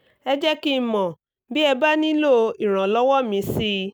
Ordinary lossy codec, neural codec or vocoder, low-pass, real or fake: none; none; none; real